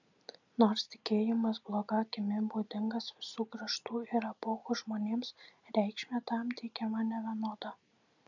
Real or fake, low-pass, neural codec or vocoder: real; 7.2 kHz; none